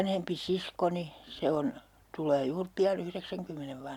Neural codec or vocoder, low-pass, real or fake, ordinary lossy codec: none; 19.8 kHz; real; none